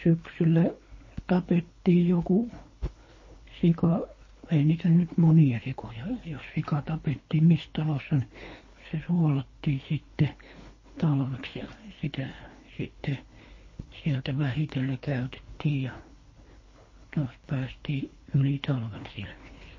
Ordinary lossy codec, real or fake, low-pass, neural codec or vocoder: MP3, 32 kbps; fake; 7.2 kHz; codec, 24 kHz, 3 kbps, HILCodec